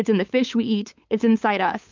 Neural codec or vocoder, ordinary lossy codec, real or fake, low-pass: codec, 16 kHz, 8 kbps, FunCodec, trained on LibriTTS, 25 frames a second; MP3, 64 kbps; fake; 7.2 kHz